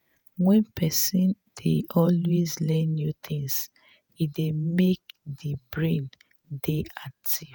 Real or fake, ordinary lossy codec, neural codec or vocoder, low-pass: fake; none; vocoder, 48 kHz, 128 mel bands, Vocos; none